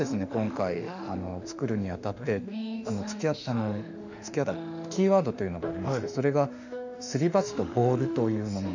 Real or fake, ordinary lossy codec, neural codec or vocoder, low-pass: fake; none; autoencoder, 48 kHz, 32 numbers a frame, DAC-VAE, trained on Japanese speech; 7.2 kHz